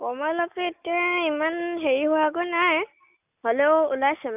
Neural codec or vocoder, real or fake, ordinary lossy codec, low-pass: none; real; none; 3.6 kHz